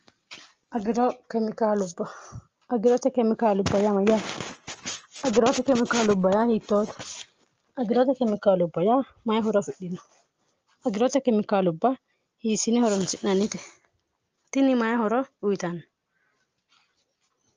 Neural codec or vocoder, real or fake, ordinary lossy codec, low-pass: none; real; Opus, 24 kbps; 7.2 kHz